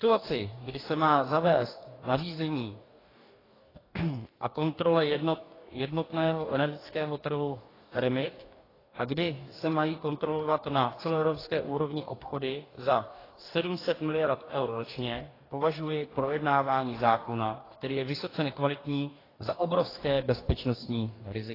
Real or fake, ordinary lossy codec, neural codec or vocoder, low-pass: fake; AAC, 24 kbps; codec, 44.1 kHz, 2.6 kbps, DAC; 5.4 kHz